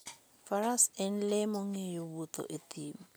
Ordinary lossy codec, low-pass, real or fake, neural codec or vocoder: none; none; real; none